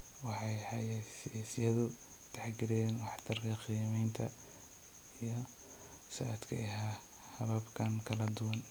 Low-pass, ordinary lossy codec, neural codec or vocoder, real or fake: none; none; none; real